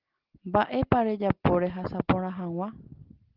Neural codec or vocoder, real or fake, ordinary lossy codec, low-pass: none; real; Opus, 16 kbps; 5.4 kHz